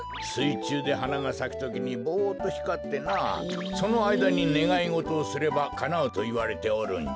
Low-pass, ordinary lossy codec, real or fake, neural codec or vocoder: none; none; real; none